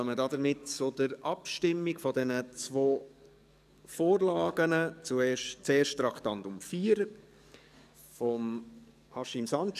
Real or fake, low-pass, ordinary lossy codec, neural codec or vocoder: fake; 14.4 kHz; none; codec, 44.1 kHz, 7.8 kbps, DAC